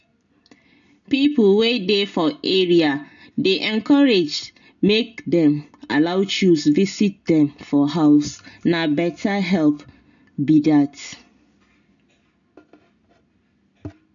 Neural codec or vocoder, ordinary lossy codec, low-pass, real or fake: none; none; 7.2 kHz; real